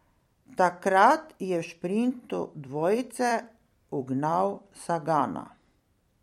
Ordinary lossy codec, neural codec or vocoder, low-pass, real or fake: MP3, 64 kbps; vocoder, 44.1 kHz, 128 mel bands every 256 samples, BigVGAN v2; 19.8 kHz; fake